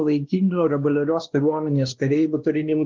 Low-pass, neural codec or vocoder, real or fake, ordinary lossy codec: 7.2 kHz; codec, 16 kHz, 1 kbps, X-Codec, WavLM features, trained on Multilingual LibriSpeech; fake; Opus, 16 kbps